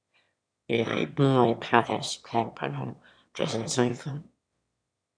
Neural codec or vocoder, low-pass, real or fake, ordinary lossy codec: autoencoder, 22.05 kHz, a latent of 192 numbers a frame, VITS, trained on one speaker; 9.9 kHz; fake; MP3, 96 kbps